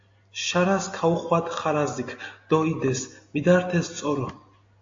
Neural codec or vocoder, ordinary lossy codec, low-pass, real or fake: none; AAC, 48 kbps; 7.2 kHz; real